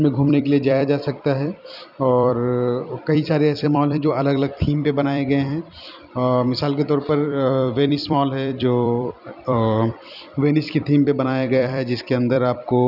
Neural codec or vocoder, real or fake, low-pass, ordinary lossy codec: vocoder, 44.1 kHz, 128 mel bands every 256 samples, BigVGAN v2; fake; 5.4 kHz; none